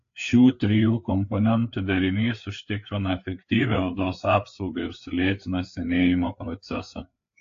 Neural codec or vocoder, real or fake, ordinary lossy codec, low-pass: codec, 16 kHz, 4 kbps, FreqCodec, larger model; fake; AAC, 48 kbps; 7.2 kHz